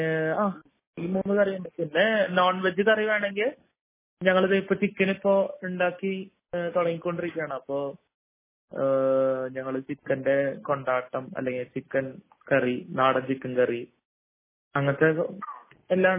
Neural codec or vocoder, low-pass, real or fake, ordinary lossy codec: none; 3.6 kHz; real; MP3, 16 kbps